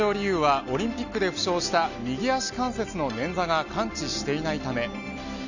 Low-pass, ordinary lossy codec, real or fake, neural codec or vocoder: 7.2 kHz; none; real; none